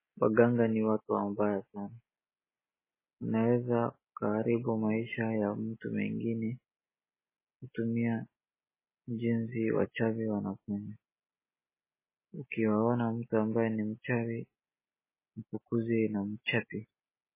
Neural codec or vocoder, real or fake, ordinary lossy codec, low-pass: none; real; MP3, 16 kbps; 3.6 kHz